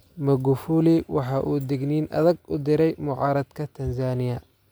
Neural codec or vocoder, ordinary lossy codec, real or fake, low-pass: none; none; real; none